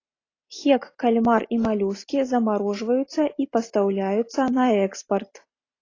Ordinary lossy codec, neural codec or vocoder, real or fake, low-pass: AAC, 32 kbps; none; real; 7.2 kHz